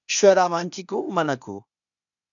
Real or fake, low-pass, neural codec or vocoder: fake; 7.2 kHz; codec, 16 kHz, 0.8 kbps, ZipCodec